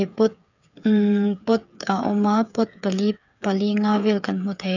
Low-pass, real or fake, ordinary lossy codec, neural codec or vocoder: 7.2 kHz; fake; none; codec, 16 kHz, 8 kbps, FreqCodec, smaller model